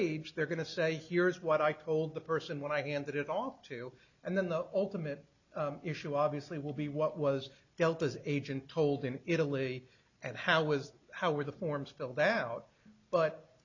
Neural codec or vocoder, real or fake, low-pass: none; real; 7.2 kHz